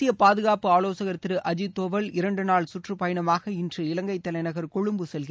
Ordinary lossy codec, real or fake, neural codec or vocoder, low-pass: none; real; none; none